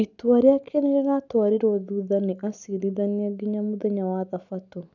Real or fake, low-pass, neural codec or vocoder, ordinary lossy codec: real; 7.2 kHz; none; none